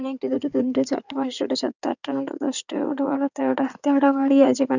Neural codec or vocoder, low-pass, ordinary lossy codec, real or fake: codec, 16 kHz in and 24 kHz out, 2.2 kbps, FireRedTTS-2 codec; 7.2 kHz; none; fake